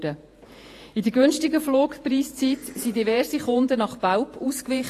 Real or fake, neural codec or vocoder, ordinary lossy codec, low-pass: fake; vocoder, 48 kHz, 128 mel bands, Vocos; AAC, 48 kbps; 14.4 kHz